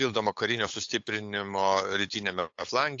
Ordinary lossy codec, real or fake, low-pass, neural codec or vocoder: AAC, 48 kbps; fake; 7.2 kHz; codec, 16 kHz, 8 kbps, FunCodec, trained on LibriTTS, 25 frames a second